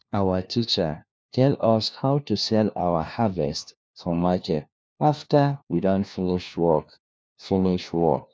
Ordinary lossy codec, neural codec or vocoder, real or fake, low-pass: none; codec, 16 kHz, 1 kbps, FunCodec, trained on LibriTTS, 50 frames a second; fake; none